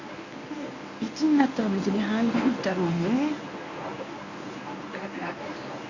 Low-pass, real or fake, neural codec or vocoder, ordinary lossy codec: 7.2 kHz; fake; codec, 24 kHz, 0.9 kbps, WavTokenizer, medium speech release version 1; none